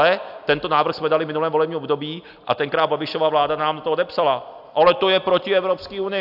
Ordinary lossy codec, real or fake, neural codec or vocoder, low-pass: AAC, 48 kbps; real; none; 5.4 kHz